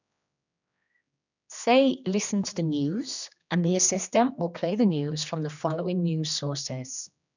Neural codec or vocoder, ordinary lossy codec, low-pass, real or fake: codec, 16 kHz, 2 kbps, X-Codec, HuBERT features, trained on general audio; none; 7.2 kHz; fake